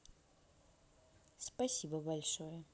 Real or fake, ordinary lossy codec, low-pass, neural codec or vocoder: real; none; none; none